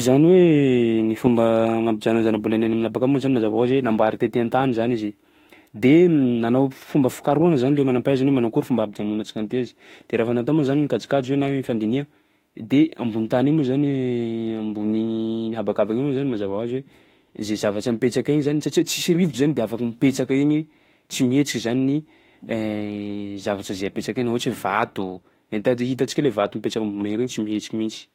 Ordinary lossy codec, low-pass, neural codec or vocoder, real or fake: AAC, 48 kbps; 19.8 kHz; autoencoder, 48 kHz, 32 numbers a frame, DAC-VAE, trained on Japanese speech; fake